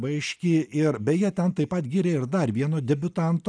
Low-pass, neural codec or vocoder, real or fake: 9.9 kHz; none; real